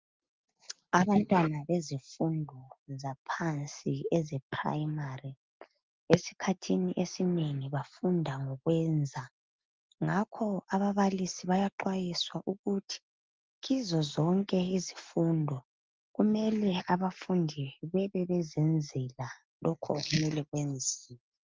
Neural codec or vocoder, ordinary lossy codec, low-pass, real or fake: none; Opus, 32 kbps; 7.2 kHz; real